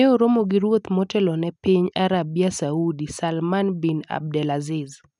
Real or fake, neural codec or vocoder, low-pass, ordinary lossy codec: real; none; 10.8 kHz; none